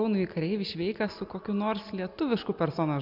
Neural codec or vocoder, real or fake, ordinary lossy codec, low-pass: none; real; MP3, 48 kbps; 5.4 kHz